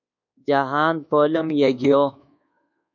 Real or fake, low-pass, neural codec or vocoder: fake; 7.2 kHz; codec, 24 kHz, 1.2 kbps, DualCodec